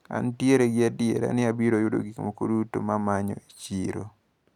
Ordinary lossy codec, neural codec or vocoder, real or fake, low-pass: none; none; real; 19.8 kHz